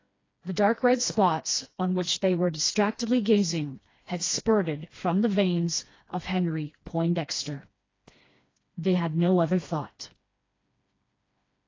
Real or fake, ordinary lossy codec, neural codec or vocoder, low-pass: fake; AAC, 32 kbps; codec, 16 kHz, 2 kbps, FreqCodec, smaller model; 7.2 kHz